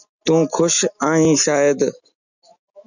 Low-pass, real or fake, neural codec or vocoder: 7.2 kHz; real; none